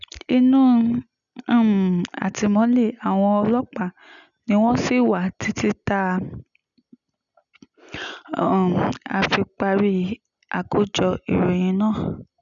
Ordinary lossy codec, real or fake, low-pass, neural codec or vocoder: none; real; 7.2 kHz; none